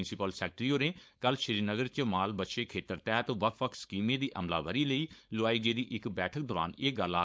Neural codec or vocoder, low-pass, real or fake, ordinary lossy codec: codec, 16 kHz, 4.8 kbps, FACodec; none; fake; none